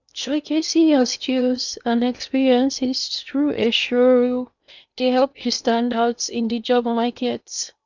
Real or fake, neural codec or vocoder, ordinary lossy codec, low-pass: fake; codec, 16 kHz in and 24 kHz out, 0.8 kbps, FocalCodec, streaming, 65536 codes; none; 7.2 kHz